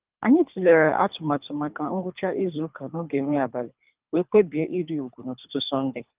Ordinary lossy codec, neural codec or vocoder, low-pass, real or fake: Opus, 32 kbps; codec, 24 kHz, 3 kbps, HILCodec; 3.6 kHz; fake